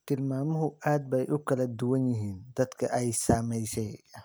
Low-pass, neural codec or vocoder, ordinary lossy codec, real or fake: none; none; none; real